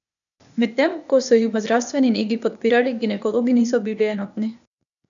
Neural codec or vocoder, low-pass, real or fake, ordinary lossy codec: codec, 16 kHz, 0.8 kbps, ZipCodec; 7.2 kHz; fake; none